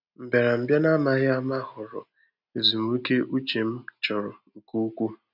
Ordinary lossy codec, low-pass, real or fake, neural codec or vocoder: none; 5.4 kHz; real; none